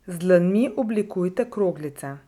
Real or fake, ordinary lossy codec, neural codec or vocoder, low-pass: real; none; none; 19.8 kHz